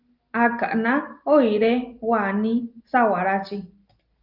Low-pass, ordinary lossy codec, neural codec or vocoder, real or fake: 5.4 kHz; Opus, 32 kbps; none; real